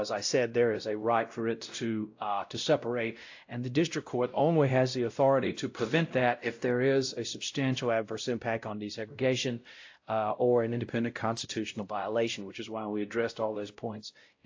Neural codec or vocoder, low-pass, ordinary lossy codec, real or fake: codec, 16 kHz, 0.5 kbps, X-Codec, WavLM features, trained on Multilingual LibriSpeech; 7.2 kHz; AAC, 48 kbps; fake